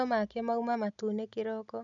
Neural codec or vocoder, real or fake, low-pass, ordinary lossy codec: none; real; 7.2 kHz; none